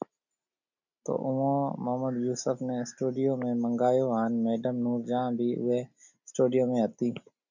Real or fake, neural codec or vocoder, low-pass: real; none; 7.2 kHz